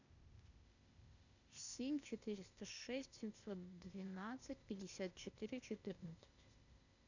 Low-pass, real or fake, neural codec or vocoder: 7.2 kHz; fake; codec, 16 kHz, 0.8 kbps, ZipCodec